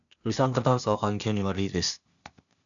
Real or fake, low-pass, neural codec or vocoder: fake; 7.2 kHz; codec, 16 kHz, 0.8 kbps, ZipCodec